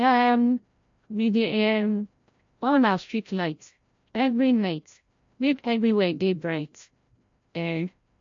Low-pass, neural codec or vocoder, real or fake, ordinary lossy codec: 7.2 kHz; codec, 16 kHz, 0.5 kbps, FreqCodec, larger model; fake; MP3, 48 kbps